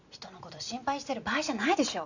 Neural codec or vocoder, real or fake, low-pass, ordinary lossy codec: none; real; 7.2 kHz; none